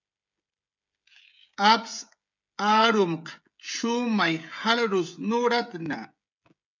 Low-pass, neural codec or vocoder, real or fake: 7.2 kHz; codec, 16 kHz, 16 kbps, FreqCodec, smaller model; fake